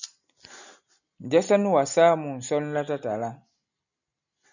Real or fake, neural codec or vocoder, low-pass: real; none; 7.2 kHz